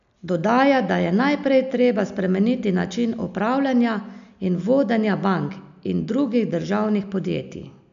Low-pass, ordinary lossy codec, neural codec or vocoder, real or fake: 7.2 kHz; none; none; real